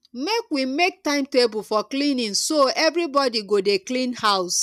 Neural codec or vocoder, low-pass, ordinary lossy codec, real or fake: none; 14.4 kHz; none; real